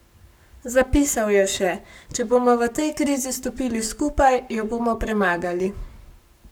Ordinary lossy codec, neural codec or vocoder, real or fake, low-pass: none; codec, 44.1 kHz, 7.8 kbps, Pupu-Codec; fake; none